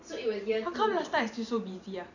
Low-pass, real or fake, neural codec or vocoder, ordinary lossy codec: 7.2 kHz; fake; vocoder, 44.1 kHz, 128 mel bands every 512 samples, BigVGAN v2; none